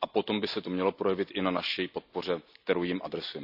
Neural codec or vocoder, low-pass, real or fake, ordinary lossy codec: none; 5.4 kHz; real; none